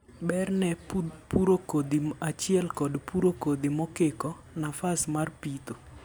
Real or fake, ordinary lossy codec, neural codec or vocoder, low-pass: real; none; none; none